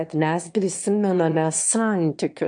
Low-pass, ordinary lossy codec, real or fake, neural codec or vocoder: 9.9 kHz; AAC, 64 kbps; fake; autoencoder, 22.05 kHz, a latent of 192 numbers a frame, VITS, trained on one speaker